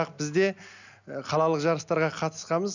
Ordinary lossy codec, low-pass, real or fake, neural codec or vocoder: none; 7.2 kHz; real; none